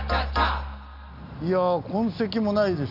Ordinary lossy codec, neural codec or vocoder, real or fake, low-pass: none; none; real; 5.4 kHz